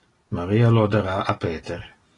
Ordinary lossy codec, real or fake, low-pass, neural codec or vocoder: AAC, 32 kbps; real; 10.8 kHz; none